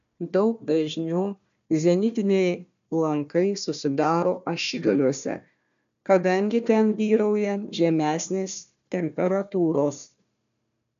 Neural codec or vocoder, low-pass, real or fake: codec, 16 kHz, 1 kbps, FunCodec, trained on Chinese and English, 50 frames a second; 7.2 kHz; fake